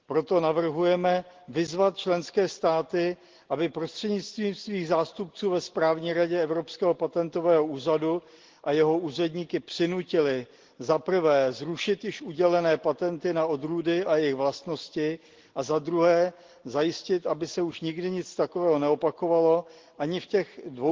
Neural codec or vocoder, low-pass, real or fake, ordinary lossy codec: none; 7.2 kHz; real; Opus, 16 kbps